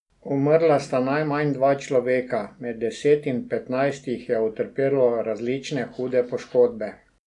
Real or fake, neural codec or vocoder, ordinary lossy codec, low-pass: real; none; none; 10.8 kHz